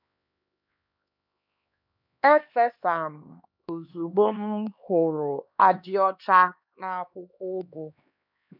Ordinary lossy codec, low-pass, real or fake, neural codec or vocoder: none; 5.4 kHz; fake; codec, 16 kHz, 2 kbps, X-Codec, HuBERT features, trained on LibriSpeech